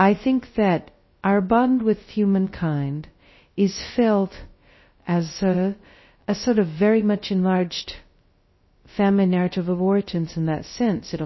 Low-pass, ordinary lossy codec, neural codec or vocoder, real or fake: 7.2 kHz; MP3, 24 kbps; codec, 16 kHz, 0.2 kbps, FocalCodec; fake